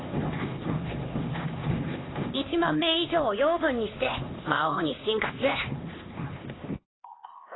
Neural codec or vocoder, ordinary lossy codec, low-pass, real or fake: codec, 16 kHz, 2 kbps, X-Codec, HuBERT features, trained on LibriSpeech; AAC, 16 kbps; 7.2 kHz; fake